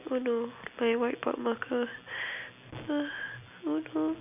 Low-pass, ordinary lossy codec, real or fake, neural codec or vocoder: 3.6 kHz; none; real; none